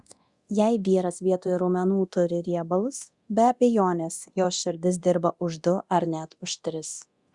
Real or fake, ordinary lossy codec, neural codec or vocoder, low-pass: fake; Opus, 64 kbps; codec, 24 kHz, 0.9 kbps, DualCodec; 10.8 kHz